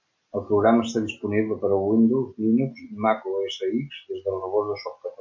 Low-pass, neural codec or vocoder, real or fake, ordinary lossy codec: 7.2 kHz; none; real; MP3, 48 kbps